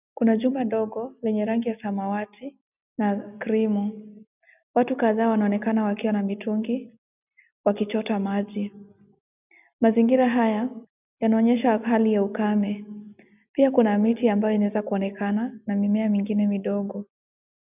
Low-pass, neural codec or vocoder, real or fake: 3.6 kHz; none; real